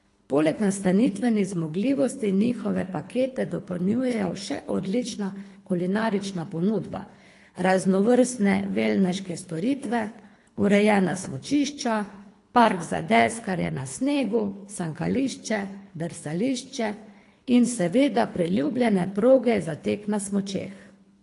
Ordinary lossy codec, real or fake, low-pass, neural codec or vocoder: AAC, 48 kbps; fake; 10.8 kHz; codec, 24 kHz, 3 kbps, HILCodec